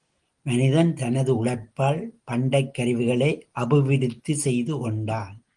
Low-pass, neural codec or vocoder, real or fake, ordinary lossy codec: 9.9 kHz; none; real; Opus, 24 kbps